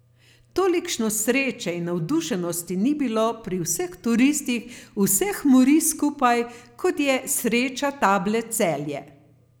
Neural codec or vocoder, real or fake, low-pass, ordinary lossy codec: none; real; none; none